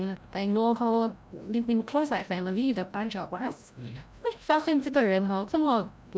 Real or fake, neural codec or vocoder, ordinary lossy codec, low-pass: fake; codec, 16 kHz, 0.5 kbps, FreqCodec, larger model; none; none